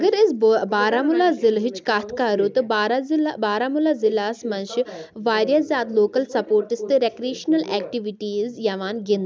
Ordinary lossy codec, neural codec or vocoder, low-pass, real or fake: none; none; none; real